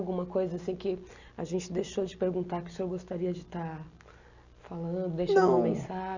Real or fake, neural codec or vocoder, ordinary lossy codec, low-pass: real; none; Opus, 64 kbps; 7.2 kHz